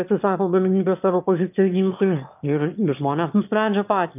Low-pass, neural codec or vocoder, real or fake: 3.6 kHz; autoencoder, 22.05 kHz, a latent of 192 numbers a frame, VITS, trained on one speaker; fake